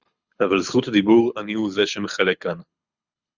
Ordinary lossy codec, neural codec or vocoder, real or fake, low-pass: Opus, 64 kbps; codec, 24 kHz, 6 kbps, HILCodec; fake; 7.2 kHz